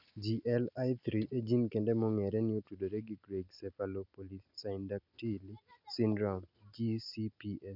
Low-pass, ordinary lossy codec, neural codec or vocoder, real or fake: 5.4 kHz; none; none; real